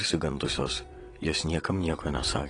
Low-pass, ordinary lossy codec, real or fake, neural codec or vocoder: 9.9 kHz; AAC, 32 kbps; fake; vocoder, 22.05 kHz, 80 mel bands, WaveNeXt